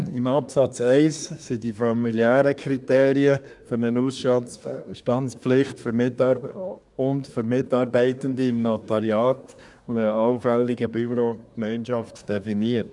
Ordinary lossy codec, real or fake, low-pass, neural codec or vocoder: none; fake; 10.8 kHz; codec, 24 kHz, 1 kbps, SNAC